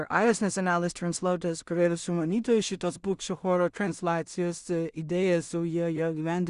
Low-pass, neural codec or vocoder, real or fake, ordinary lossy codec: 10.8 kHz; codec, 16 kHz in and 24 kHz out, 0.4 kbps, LongCat-Audio-Codec, two codebook decoder; fake; Opus, 64 kbps